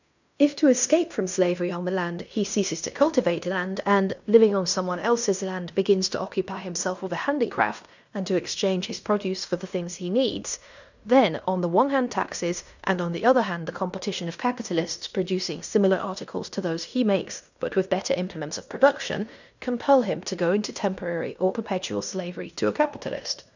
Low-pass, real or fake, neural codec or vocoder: 7.2 kHz; fake; codec, 16 kHz in and 24 kHz out, 0.9 kbps, LongCat-Audio-Codec, fine tuned four codebook decoder